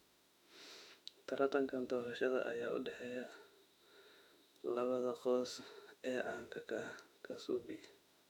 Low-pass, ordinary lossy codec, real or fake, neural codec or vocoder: 19.8 kHz; none; fake; autoencoder, 48 kHz, 32 numbers a frame, DAC-VAE, trained on Japanese speech